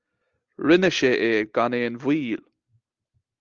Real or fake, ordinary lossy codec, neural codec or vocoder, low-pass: real; Opus, 32 kbps; none; 7.2 kHz